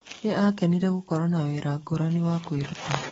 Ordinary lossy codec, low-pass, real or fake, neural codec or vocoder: AAC, 24 kbps; 19.8 kHz; fake; codec, 44.1 kHz, 7.8 kbps, DAC